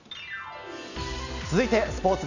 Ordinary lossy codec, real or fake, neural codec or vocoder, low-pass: none; real; none; 7.2 kHz